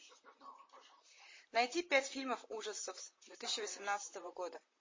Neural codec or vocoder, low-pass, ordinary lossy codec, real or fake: vocoder, 44.1 kHz, 128 mel bands, Pupu-Vocoder; 7.2 kHz; MP3, 32 kbps; fake